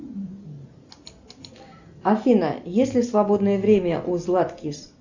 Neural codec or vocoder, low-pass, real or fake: none; 7.2 kHz; real